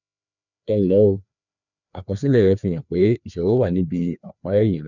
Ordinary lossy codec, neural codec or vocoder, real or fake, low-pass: none; codec, 16 kHz, 2 kbps, FreqCodec, larger model; fake; 7.2 kHz